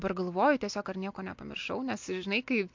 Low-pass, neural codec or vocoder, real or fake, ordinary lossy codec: 7.2 kHz; none; real; MP3, 48 kbps